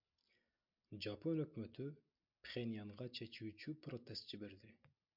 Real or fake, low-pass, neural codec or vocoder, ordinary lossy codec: real; 5.4 kHz; none; MP3, 48 kbps